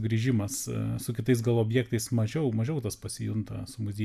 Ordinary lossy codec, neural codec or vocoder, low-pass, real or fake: AAC, 96 kbps; none; 14.4 kHz; real